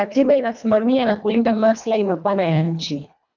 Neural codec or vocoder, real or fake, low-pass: codec, 24 kHz, 1.5 kbps, HILCodec; fake; 7.2 kHz